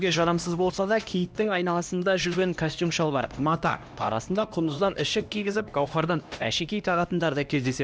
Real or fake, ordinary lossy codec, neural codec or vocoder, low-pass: fake; none; codec, 16 kHz, 1 kbps, X-Codec, HuBERT features, trained on LibriSpeech; none